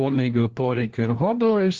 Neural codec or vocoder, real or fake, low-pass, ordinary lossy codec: codec, 16 kHz, 1 kbps, FunCodec, trained on LibriTTS, 50 frames a second; fake; 7.2 kHz; Opus, 16 kbps